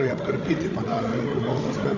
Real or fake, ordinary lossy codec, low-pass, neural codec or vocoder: fake; AAC, 48 kbps; 7.2 kHz; codec, 16 kHz, 16 kbps, FreqCodec, larger model